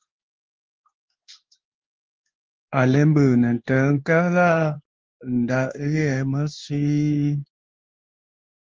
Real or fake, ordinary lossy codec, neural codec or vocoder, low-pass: fake; Opus, 32 kbps; codec, 16 kHz in and 24 kHz out, 1 kbps, XY-Tokenizer; 7.2 kHz